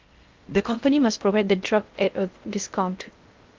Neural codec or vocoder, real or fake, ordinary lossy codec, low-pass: codec, 16 kHz in and 24 kHz out, 0.6 kbps, FocalCodec, streaming, 4096 codes; fake; Opus, 32 kbps; 7.2 kHz